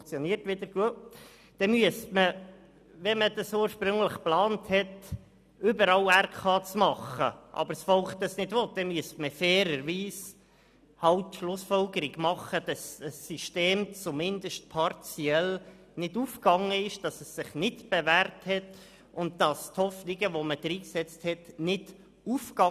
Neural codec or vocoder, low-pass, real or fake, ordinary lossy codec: none; 14.4 kHz; real; none